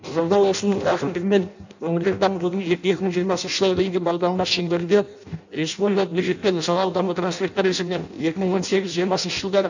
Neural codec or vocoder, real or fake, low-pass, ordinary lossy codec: codec, 16 kHz in and 24 kHz out, 0.6 kbps, FireRedTTS-2 codec; fake; 7.2 kHz; none